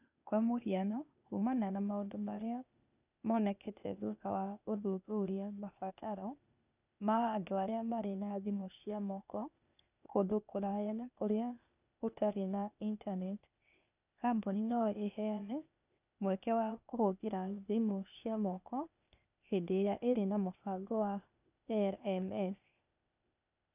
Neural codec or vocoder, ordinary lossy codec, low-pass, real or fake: codec, 16 kHz, 0.8 kbps, ZipCodec; none; 3.6 kHz; fake